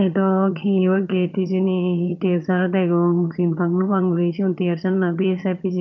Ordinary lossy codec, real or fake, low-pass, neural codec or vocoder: none; fake; 7.2 kHz; vocoder, 22.05 kHz, 80 mel bands, HiFi-GAN